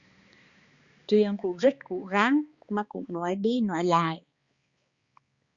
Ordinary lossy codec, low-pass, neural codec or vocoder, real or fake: Opus, 64 kbps; 7.2 kHz; codec, 16 kHz, 2 kbps, X-Codec, HuBERT features, trained on balanced general audio; fake